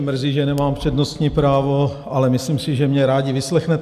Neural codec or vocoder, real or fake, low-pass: none; real; 14.4 kHz